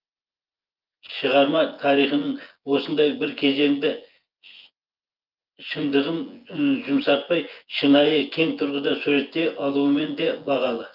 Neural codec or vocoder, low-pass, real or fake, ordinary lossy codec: vocoder, 24 kHz, 100 mel bands, Vocos; 5.4 kHz; fake; Opus, 24 kbps